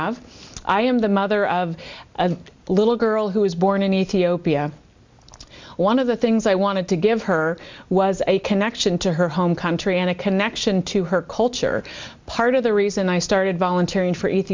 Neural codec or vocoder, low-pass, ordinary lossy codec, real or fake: none; 7.2 kHz; MP3, 64 kbps; real